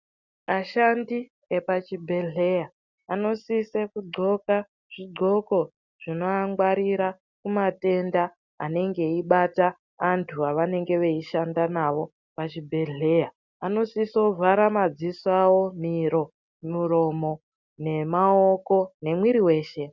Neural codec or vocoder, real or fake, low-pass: none; real; 7.2 kHz